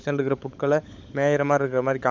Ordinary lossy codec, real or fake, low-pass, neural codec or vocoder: none; fake; none; codec, 16 kHz, 16 kbps, FunCodec, trained on LibriTTS, 50 frames a second